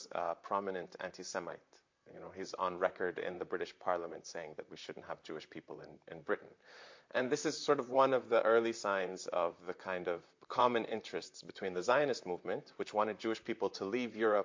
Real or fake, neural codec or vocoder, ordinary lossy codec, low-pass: fake; vocoder, 44.1 kHz, 128 mel bands, Pupu-Vocoder; MP3, 48 kbps; 7.2 kHz